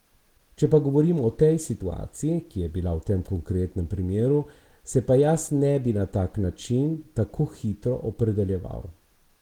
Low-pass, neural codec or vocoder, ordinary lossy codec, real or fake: 19.8 kHz; none; Opus, 16 kbps; real